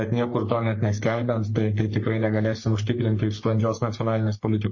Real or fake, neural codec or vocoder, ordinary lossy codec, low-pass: fake; codec, 44.1 kHz, 3.4 kbps, Pupu-Codec; MP3, 32 kbps; 7.2 kHz